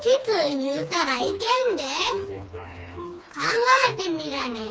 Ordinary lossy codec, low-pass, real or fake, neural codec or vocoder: none; none; fake; codec, 16 kHz, 2 kbps, FreqCodec, smaller model